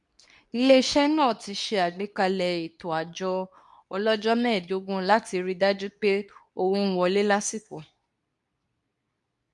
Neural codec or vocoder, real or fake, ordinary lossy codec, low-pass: codec, 24 kHz, 0.9 kbps, WavTokenizer, medium speech release version 2; fake; none; 10.8 kHz